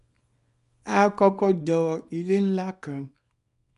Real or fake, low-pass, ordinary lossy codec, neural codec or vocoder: fake; 10.8 kHz; none; codec, 24 kHz, 0.9 kbps, WavTokenizer, small release